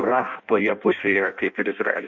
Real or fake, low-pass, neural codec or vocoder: fake; 7.2 kHz; codec, 16 kHz in and 24 kHz out, 0.6 kbps, FireRedTTS-2 codec